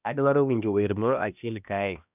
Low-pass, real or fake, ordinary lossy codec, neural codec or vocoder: 3.6 kHz; fake; none; codec, 16 kHz, 1 kbps, X-Codec, HuBERT features, trained on balanced general audio